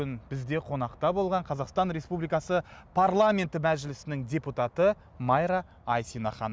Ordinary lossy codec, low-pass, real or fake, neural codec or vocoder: none; none; real; none